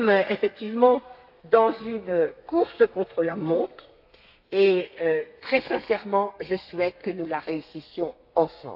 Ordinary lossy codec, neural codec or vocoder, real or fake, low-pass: AAC, 48 kbps; codec, 32 kHz, 1.9 kbps, SNAC; fake; 5.4 kHz